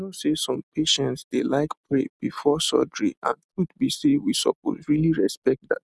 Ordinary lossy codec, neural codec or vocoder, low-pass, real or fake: none; none; none; real